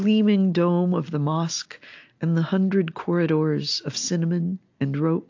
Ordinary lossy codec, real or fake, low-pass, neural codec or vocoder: AAC, 48 kbps; real; 7.2 kHz; none